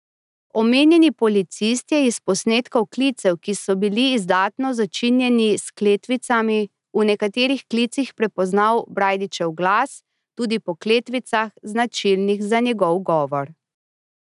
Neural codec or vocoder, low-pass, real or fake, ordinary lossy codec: none; 10.8 kHz; real; none